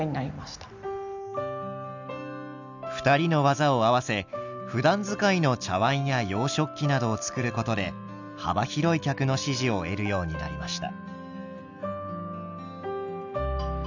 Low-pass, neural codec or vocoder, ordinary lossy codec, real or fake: 7.2 kHz; none; none; real